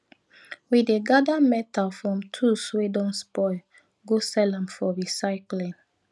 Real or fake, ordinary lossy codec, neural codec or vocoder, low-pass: real; none; none; none